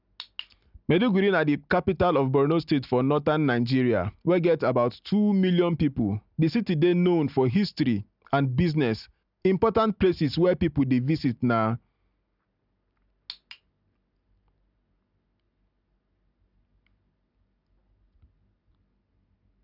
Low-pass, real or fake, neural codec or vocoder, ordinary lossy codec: 5.4 kHz; real; none; none